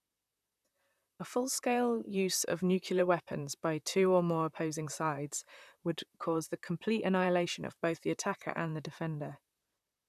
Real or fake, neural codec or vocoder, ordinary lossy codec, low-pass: fake; vocoder, 44.1 kHz, 128 mel bands, Pupu-Vocoder; none; 14.4 kHz